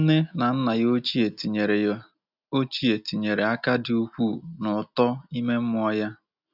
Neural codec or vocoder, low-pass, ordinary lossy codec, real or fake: none; 5.4 kHz; none; real